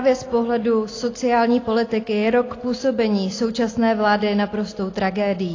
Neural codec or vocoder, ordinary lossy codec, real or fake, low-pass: none; AAC, 32 kbps; real; 7.2 kHz